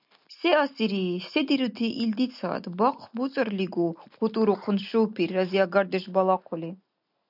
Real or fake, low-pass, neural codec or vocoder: real; 5.4 kHz; none